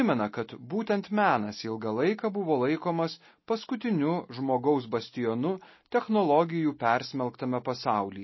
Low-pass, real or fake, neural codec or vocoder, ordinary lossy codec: 7.2 kHz; real; none; MP3, 24 kbps